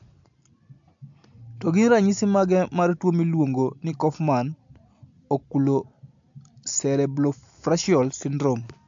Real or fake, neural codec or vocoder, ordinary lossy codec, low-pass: real; none; AAC, 64 kbps; 7.2 kHz